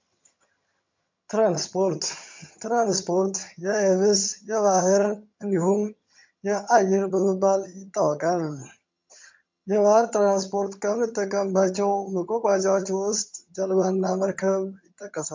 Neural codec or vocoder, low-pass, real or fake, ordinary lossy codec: vocoder, 22.05 kHz, 80 mel bands, HiFi-GAN; 7.2 kHz; fake; MP3, 64 kbps